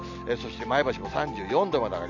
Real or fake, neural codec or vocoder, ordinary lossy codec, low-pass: real; none; none; 7.2 kHz